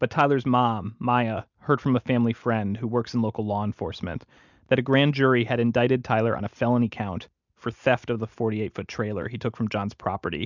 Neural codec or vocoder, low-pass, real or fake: none; 7.2 kHz; real